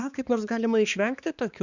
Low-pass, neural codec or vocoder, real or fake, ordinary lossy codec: 7.2 kHz; codec, 16 kHz, 2 kbps, X-Codec, HuBERT features, trained on balanced general audio; fake; Opus, 64 kbps